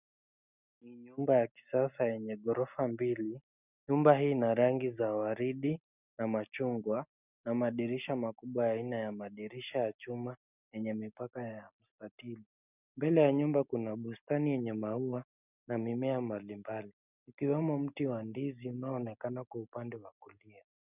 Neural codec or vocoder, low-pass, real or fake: none; 3.6 kHz; real